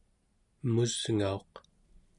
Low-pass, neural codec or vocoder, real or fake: 10.8 kHz; none; real